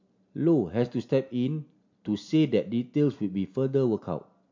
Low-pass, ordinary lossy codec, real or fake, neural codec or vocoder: 7.2 kHz; MP3, 48 kbps; real; none